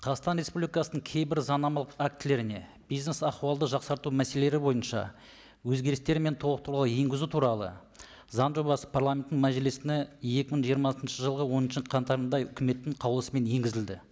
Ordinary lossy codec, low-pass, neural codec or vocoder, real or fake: none; none; none; real